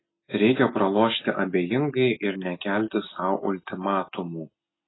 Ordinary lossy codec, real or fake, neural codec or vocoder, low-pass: AAC, 16 kbps; real; none; 7.2 kHz